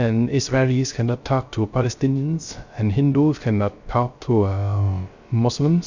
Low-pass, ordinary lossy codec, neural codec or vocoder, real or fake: 7.2 kHz; Opus, 64 kbps; codec, 16 kHz, 0.3 kbps, FocalCodec; fake